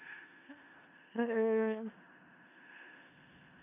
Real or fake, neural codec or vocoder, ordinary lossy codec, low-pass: fake; codec, 16 kHz in and 24 kHz out, 0.4 kbps, LongCat-Audio-Codec, four codebook decoder; AAC, 32 kbps; 3.6 kHz